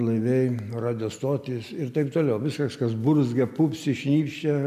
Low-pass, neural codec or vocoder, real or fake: 14.4 kHz; none; real